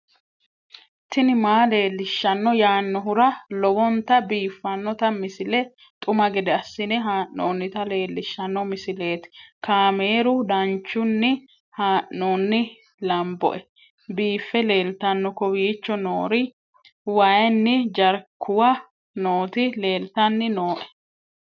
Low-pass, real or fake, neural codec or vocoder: 7.2 kHz; real; none